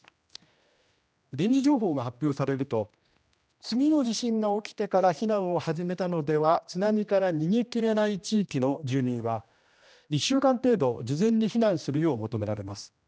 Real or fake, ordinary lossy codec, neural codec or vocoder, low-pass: fake; none; codec, 16 kHz, 1 kbps, X-Codec, HuBERT features, trained on general audio; none